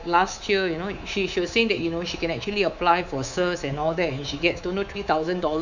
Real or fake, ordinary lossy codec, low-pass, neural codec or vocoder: fake; none; 7.2 kHz; codec, 24 kHz, 3.1 kbps, DualCodec